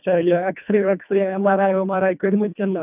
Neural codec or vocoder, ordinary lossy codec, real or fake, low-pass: codec, 24 kHz, 1.5 kbps, HILCodec; none; fake; 3.6 kHz